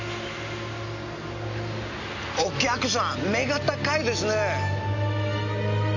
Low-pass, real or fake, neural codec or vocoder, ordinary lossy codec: 7.2 kHz; real; none; none